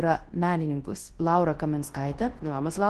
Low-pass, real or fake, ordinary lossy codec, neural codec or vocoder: 10.8 kHz; fake; Opus, 16 kbps; codec, 24 kHz, 0.9 kbps, WavTokenizer, large speech release